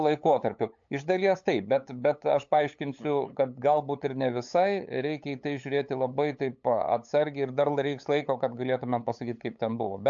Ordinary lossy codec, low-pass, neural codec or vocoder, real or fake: AAC, 64 kbps; 7.2 kHz; codec, 16 kHz, 8 kbps, FunCodec, trained on LibriTTS, 25 frames a second; fake